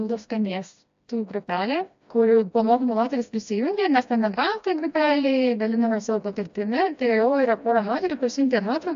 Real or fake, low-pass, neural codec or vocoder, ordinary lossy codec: fake; 7.2 kHz; codec, 16 kHz, 1 kbps, FreqCodec, smaller model; AAC, 64 kbps